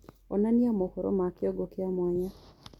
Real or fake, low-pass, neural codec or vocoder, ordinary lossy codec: real; 19.8 kHz; none; MP3, 96 kbps